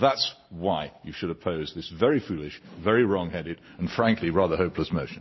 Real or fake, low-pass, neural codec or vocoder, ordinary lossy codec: real; 7.2 kHz; none; MP3, 24 kbps